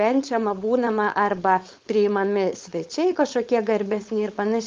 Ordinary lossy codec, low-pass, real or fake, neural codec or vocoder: Opus, 32 kbps; 7.2 kHz; fake; codec, 16 kHz, 4.8 kbps, FACodec